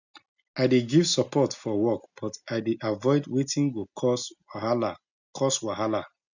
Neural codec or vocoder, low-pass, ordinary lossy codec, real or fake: none; 7.2 kHz; none; real